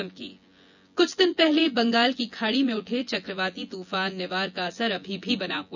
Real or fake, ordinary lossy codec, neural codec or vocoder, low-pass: fake; none; vocoder, 24 kHz, 100 mel bands, Vocos; 7.2 kHz